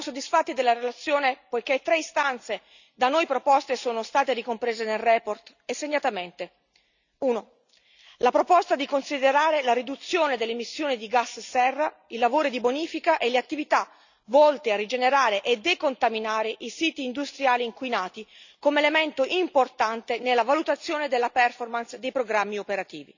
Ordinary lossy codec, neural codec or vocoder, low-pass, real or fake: none; none; 7.2 kHz; real